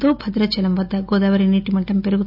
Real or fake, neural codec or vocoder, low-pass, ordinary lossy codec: real; none; 5.4 kHz; none